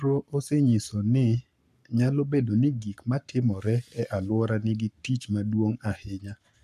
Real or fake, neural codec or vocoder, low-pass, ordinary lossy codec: fake; codec, 44.1 kHz, 7.8 kbps, Pupu-Codec; 14.4 kHz; none